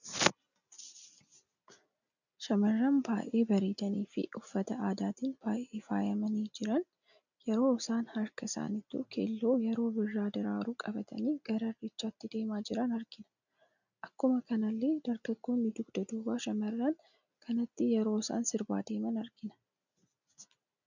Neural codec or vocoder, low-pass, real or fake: none; 7.2 kHz; real